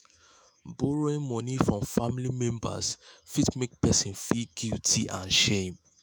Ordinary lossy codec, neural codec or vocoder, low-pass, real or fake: none; autoencoder, 48 kHz, 128 numbers a frame, DAC-VAE, trained on Japanese speech; none; fake